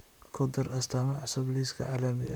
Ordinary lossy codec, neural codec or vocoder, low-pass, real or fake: none; vocoder, 44.1 kHz, 128 mel bands, Pupu-Vocoder; none; fake